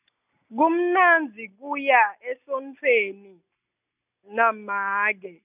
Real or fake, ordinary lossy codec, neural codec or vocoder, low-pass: real; none; none; 3.6 kHz